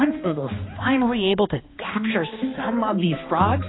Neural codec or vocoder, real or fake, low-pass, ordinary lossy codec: codec, 16 kHz, 2 kbps, X-Codec, HuBERT features, trained on balanced general audio; fake; 7.2 kHz; AAC, 16 kbps